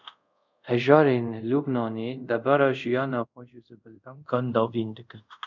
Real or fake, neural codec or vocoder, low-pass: fake; codec, 24 kHz, 0.5 kbps, DualCodec; 7.2 kHz